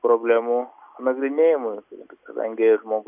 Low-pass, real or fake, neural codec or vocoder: 3.6 kHz; real; none